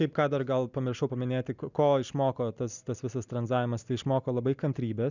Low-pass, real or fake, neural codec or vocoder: 7.2 kHz; real; none